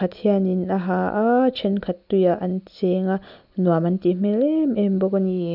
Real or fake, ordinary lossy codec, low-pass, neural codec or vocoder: real; none; 5.4 kHz; none